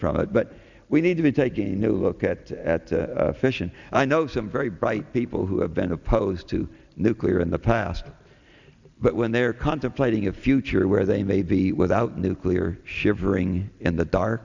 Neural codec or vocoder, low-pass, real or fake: none; 7.2 kHz; real